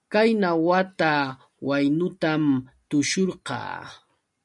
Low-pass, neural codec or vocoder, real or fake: 10.8 kHz; none; real